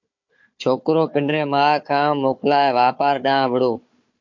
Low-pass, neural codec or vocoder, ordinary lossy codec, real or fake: 7.2 kHz; codec, 16 kHz, 4 kbps, FunCodec, trained on Chinese and English, 50 frames a second; MP3, 48 kbps; fake